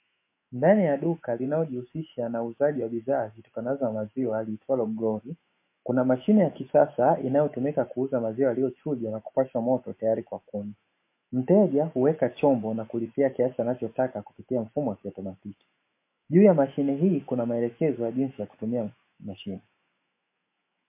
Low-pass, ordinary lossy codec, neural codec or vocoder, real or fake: 3.6 kHz; MP3, 24 kbps; autoencoder, 48 kHz, 128 numbers a frame, DAC-VAE, trained on Japanese speech; fake